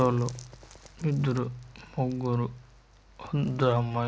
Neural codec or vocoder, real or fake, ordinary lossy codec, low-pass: none; real; none; none